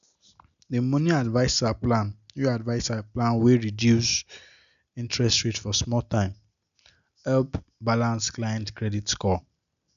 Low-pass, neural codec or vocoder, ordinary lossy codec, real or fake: 7.2 kHz; none; none; real